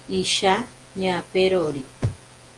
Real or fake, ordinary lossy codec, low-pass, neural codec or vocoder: fake; Opus, 24 kbps; 10.8 kHz; vocoder, 48 kHz, 128 mel bands, Vocos